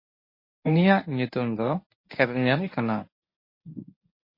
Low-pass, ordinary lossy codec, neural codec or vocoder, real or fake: 5.4 kHz; MP3, 24 kbps; codec, 24 kHz, 0.9 kbps, WavTokenizer, medium speech release version 1; fake